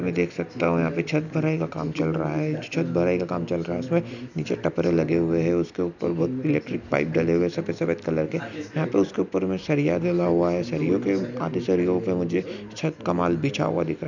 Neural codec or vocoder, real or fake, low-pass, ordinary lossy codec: none; real; 7.2 kHz; none